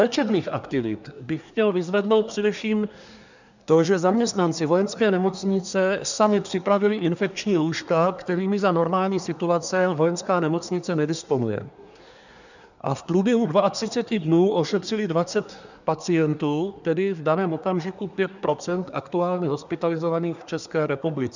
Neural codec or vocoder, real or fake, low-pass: codec, 24 kHz, 1 kbps, SNAC; fake; 7.2 kHz